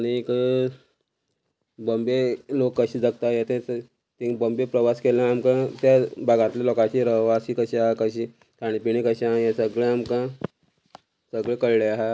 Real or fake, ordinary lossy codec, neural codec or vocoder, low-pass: real; none; none; none